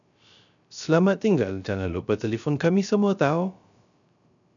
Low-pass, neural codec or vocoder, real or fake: 7.2 kHz; codec, 16 kHz, 0.3 kbps, FocalCodec; fake